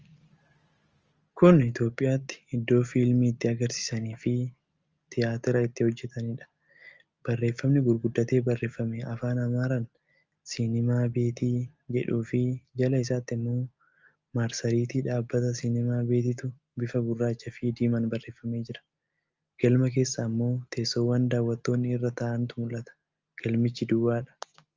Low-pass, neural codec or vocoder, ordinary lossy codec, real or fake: 7.2 kHz; none; Opus, 24 kbps; real